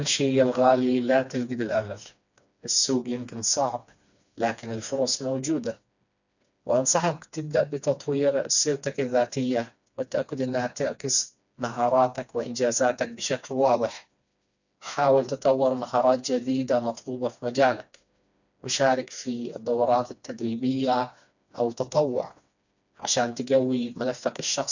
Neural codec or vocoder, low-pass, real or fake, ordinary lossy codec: codec, 16 kHz, 2 kbps, FreqCodec, smaller model; 7.2 kHz; fake; none